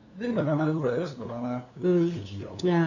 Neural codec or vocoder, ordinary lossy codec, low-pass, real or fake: codec, 16 kHz, 2 kbps, FunCodec, trained on LibriTTS, 25 frames a second; none; 7.2 kHz; fake